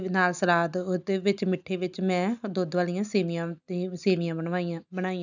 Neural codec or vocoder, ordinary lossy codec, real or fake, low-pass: none; none; real; 7.2 kHz